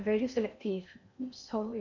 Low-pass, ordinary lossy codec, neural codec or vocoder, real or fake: 7.2 kHz; none; codec, 16 kHz in and 24 kHz out, 0.8 kbps, FocalCodec, streaming, 65536 codes; fake